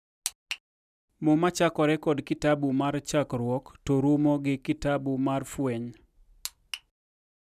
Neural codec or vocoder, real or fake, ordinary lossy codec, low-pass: none; real; none; 14.4 kHz